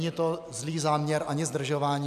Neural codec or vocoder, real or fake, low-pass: none; real; 14.4 kHz